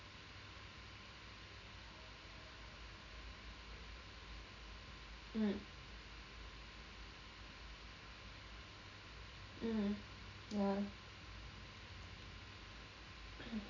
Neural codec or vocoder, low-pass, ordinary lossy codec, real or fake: none; 7.2 kHz; none; real